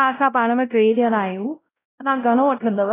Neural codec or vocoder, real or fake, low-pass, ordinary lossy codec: codec, 16 kHz, 0.5 kbps, X-Codec, WavLM features, trained on Multilingual LibriSpeech; fake; 3.6 kHz; AAC, 16 kbps